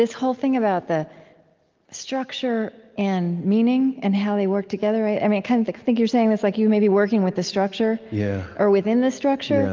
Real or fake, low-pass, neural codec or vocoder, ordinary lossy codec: real; 7.2 kHz; none; Opus, 32 kbps